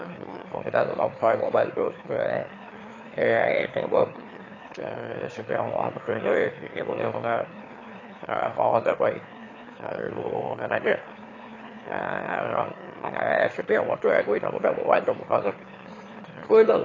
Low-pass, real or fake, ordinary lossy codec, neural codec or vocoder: 7.2 kHz; fake; AAC, 32 kbps; autoencoder, 22.05 kHz, a latent of 192 numbers a frame, VITS, trained on one speaker